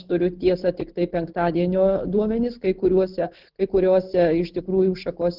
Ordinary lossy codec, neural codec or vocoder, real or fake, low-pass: Opus, 16 kbps; none; real; 5.4 kHz